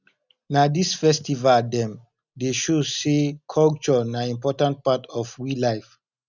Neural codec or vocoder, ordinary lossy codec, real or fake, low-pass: none; none; real; 7.2 kHz